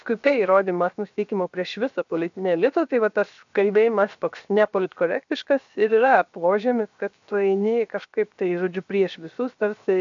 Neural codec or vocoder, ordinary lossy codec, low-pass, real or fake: codec, 16 kHz, 0.7 kbps, FocalCodec; MP3, 64 kbps; 7.2 kHz; fake